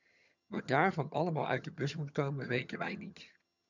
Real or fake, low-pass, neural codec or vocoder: fake; 7.2 kHz; vocoder, 22.05 kHz, 80 mel bands, HiFi-GAN